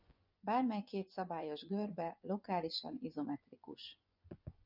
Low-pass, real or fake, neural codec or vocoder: 5.4 kHz; fake; vocoder, 24 kHz, 100 mel bands, Vocos